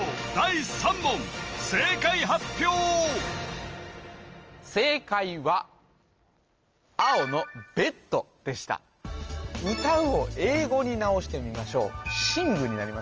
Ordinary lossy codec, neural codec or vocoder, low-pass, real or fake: Opus, 24 kbps; none; 7.2 kHz; real